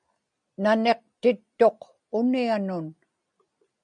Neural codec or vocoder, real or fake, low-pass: none; real; 9.9 kHz